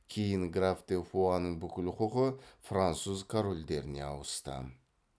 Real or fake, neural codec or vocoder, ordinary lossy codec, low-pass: real; none; none; none